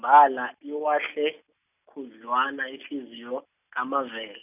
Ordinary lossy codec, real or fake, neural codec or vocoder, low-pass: none; real; none; 3.6 kHz